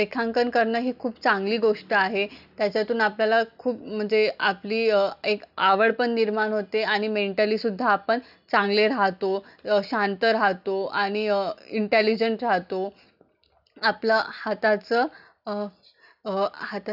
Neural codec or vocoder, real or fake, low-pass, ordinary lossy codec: none; real; 5.4 kHz; none